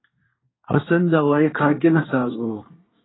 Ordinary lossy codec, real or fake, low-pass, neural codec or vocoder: AAC, 16 kbps; fake; 7.2 kHz; codec, 24 kHz, 1 kbps, SNAC